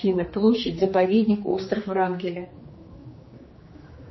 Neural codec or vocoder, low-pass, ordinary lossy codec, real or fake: codec, 16 kHz, 2 kbps, X-Codec, HuBERT features, trained on general audio; 7.2 kHz; MP3, 24 kbps; fake